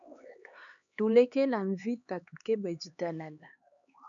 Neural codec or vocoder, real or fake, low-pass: codec, 16 kHz, 4 kbps, X-Codec, HuBERT features, trained on LibriSpeech; fake; 7.2 kHz